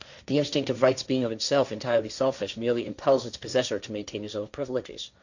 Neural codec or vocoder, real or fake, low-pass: codec, 16 kHz, 1.1 kbps, Voila-Tokenizer; fake; 7.2 kHz